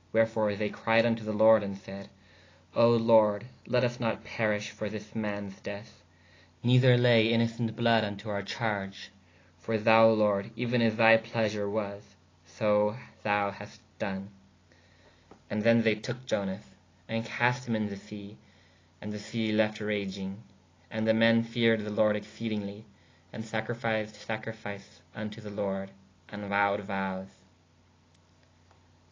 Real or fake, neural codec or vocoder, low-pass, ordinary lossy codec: real; none; 7.2 kHz; AAC, 32 kbps